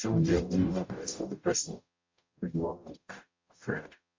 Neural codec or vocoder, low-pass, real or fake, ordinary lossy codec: codec, 44.1 kHz, 0.9 kbps, DAC; 7.2 kHz; fake; MP3, 48 kbps